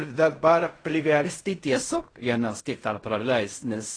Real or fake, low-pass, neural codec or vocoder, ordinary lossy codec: fake; 9.9 kHz; codec, 16 kHz in and 24 kHz out, 0.4 kbps, LongCat-Audio-Codec, fine tuned four codebook decoder; AAC, 32 kbps